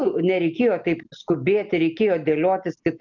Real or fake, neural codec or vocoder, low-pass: real; none; 7.2 kHz